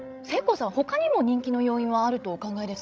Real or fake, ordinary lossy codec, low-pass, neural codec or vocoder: fake; none; none; codec, 16 kHz, 16 kbps, FreqCodec, larger model